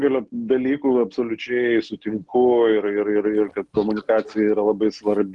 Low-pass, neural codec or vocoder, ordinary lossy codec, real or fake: 10.8 kHz; none; Opus, 24 kbps; real